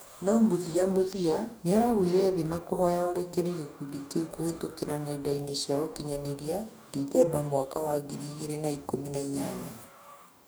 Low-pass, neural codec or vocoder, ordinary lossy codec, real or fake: none; codec, 44.1 kHz, 2.6 kbps, DAC; none; fake